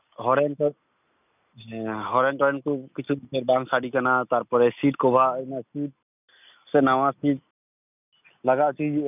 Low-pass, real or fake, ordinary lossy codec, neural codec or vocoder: 3.6 kHz; real; none; none